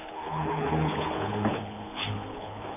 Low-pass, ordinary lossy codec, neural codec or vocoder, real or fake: 3.6 kHz; none; codec, 24 kHz, 6 kbps, HILCodec; fake